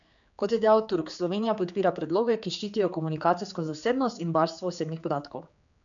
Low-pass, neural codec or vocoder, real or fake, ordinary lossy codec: 7.2 kHz; codec, 16 kHz, 4 kbps, X-Codec, HuBERT features, trained on general audio; fake; none